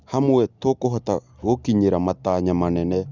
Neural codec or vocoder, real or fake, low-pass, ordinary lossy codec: none; real; 7.2 kHz; Opus, 64 kbps